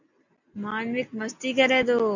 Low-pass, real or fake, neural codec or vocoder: 7.2 kHz; real; none